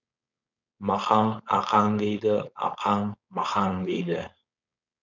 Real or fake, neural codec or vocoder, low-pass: fake; codec, 16 kHz, 4.8 kbps, FACodec; 7.2 kHz